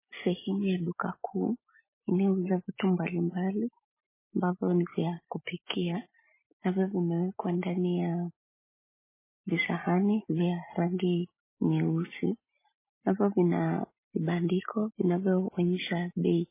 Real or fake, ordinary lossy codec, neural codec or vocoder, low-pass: real; MP3, 16 kbps; none; 3.6 kHz